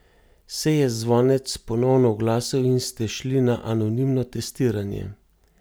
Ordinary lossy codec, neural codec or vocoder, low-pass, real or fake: none; none; none; real